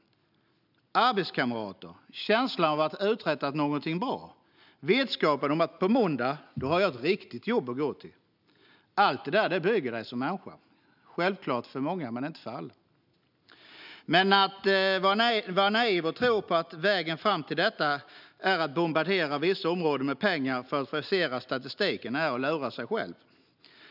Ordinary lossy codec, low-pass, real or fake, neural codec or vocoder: none; 5.4 kHz; real; none